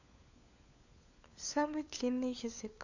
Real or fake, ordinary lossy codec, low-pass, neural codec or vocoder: fake; none; 7.2 kHz; codec, 16 kHz, 4 kbps, FunCodec, trained on LibriTTS, 50 frames a second